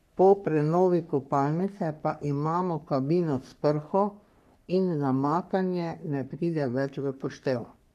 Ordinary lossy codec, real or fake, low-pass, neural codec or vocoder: none; fake; 14.4 kHz; codec, 44.1 kHz, 3.4 kbps, Pupu-Codec